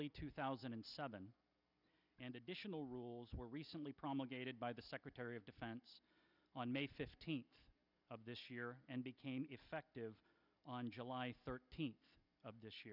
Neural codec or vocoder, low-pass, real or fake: none; 5.4 kHz; real